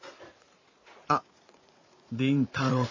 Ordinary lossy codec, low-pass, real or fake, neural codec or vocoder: MP3, 32 kbps; 7.2 kHz; fake; vocoder, 44.1 kHz, 80 mel bands, Vocos